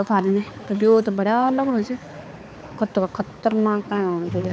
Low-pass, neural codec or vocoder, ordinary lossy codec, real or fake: none; codec, 16 kHz, 4 kbps, X-Codec, HuBERT features, trained on balanced general audio; none; fake